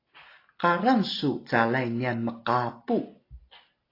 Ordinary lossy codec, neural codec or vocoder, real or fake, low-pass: AAC, 32 kbps; none; real; 5.4 kHz